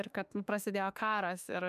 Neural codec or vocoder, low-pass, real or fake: autoencoder, 48 kHz, 32 numbers a frame, DAC-VAE, trained on Japanese speech; 14.4 kHz; fake